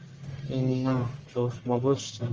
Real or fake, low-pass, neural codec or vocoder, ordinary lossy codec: fake; 7.2 kHz; codec, 44.1 kHz, 1.7 kbps, Pupu-Codec; Opus, 24 kbps